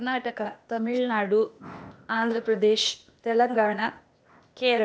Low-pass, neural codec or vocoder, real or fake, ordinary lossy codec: none; codec, 16 kHz, 0.8 kbps, ZipCodec; fake; none